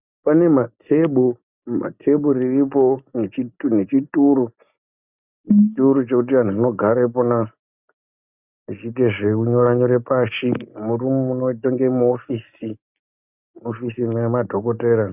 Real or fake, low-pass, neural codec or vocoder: real; 3.6 kHz; none